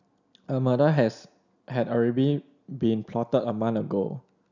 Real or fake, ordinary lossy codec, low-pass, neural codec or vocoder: real; none; 7.2 kHz; none